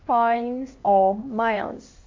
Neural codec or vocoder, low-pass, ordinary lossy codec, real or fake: codec, 16 kHz, 2 kbps, X-Codec, HuBERT features, trained on LibriSpeech; 7.2 kHz; MP3, 48 kbps; fake